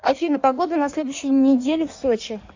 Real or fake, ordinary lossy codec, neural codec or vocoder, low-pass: fake; AAC, 48 kbps; codec, 16 kHz in and 24 kHz out, 1.1 kbps, FireRedTTS-2 codec; 7.2 kHz